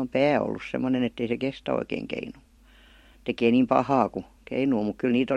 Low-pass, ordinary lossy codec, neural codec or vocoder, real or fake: 19.8 kHz; MP3, 64 kbps; vocoder, 44.1 kHz, 128 mel bands every 256 samples, BigVGAN v2; fake